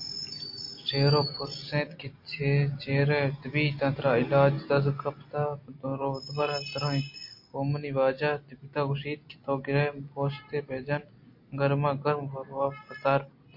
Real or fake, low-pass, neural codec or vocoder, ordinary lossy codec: real; 5.4 kHz; none; MP3, 32 kbps